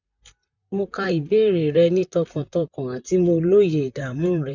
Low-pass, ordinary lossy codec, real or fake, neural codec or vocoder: 7.2 kHz; none; fake; vocoder, 44.1 kHz, 128 mel bands, Pupu-Vocoder